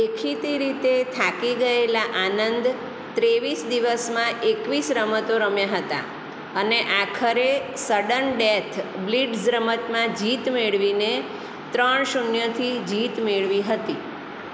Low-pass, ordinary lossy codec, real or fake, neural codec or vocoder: none; none; real; none